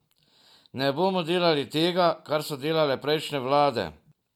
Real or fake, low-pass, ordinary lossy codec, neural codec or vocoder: real; 19.8 kHz; MP3, 96 kbps; none